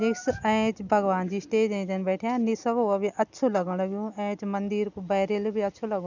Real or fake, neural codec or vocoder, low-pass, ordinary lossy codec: real; none; 7.2 kHz; none